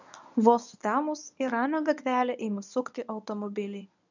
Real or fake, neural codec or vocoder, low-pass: fake; codec, 24 kHz, 0.9 kbps, WavTokenizer, medium speech release version 1; 7.2 kHz